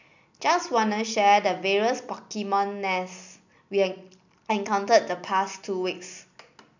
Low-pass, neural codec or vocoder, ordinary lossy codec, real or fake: 7.2 kHz; none; none; real